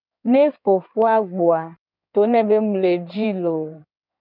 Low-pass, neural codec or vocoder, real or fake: 5.4 kHz; codec, 16 kHz in and 24 kHz out, 2.2 kbps, FireRedTTS-2 codec; fake